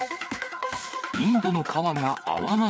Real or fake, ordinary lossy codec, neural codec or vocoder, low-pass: fake; none; codec, 16 kHz, 4 kbps, FreqCodec, larger model; none